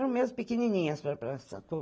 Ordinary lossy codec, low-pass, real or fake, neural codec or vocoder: none; none; real; none